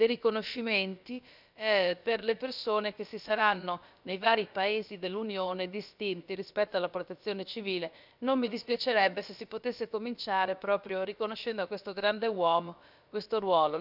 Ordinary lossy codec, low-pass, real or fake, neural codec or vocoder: none; 5.4 kHz; fake; codec, 16 kHz, about 1 kbps, DyCAST, with the encoder's durations